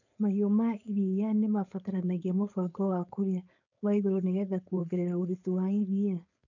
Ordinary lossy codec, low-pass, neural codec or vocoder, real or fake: none; 7.2 kHz; codec, 16 kHz, 4.8 kbps, FACodec; fake